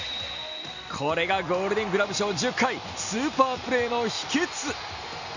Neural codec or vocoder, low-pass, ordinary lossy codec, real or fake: none; 7.2 kHz; none; real